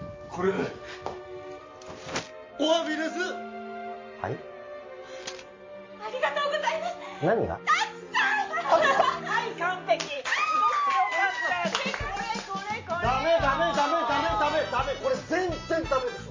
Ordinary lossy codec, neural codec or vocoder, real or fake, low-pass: MP3, 32 kbps; none; real; 7.2 kHz